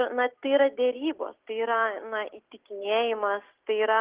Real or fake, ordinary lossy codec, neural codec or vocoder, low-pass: real; Opus, 16 kbps; none; 3.6 kHz